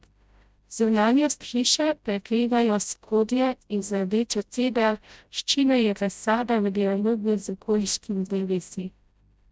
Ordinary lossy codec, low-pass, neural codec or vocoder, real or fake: none; none; codec, 16 kHz, 0.5 kbps, FreqCodec, smaller model; fake